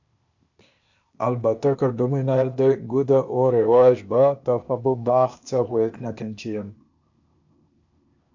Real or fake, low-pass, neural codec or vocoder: fake; 7.2 kHz; codec, 16 kHz, 0.8 kbps, ZipCodec